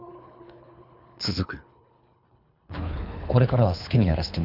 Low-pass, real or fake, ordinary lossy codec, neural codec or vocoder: 5.4 kHz; fake; none; codec, 24 kHz, 3 kbps, HILCodec